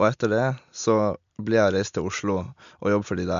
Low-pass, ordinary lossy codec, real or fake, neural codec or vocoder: 7.2 kHz; MP3, 64 kbps; real; none